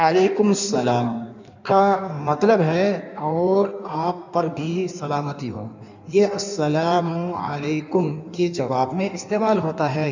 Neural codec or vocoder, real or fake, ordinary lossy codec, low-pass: codec, 16 kHz in and 24 kHz out, 1.1 kbps, FireRedTTS-2 codec; fake; none; 7.2 kHz